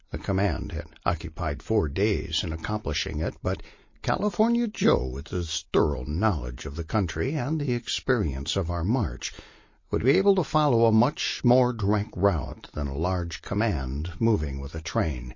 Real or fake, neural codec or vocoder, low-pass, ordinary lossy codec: real; none; 7.2 kHz; MP3, 32 kbps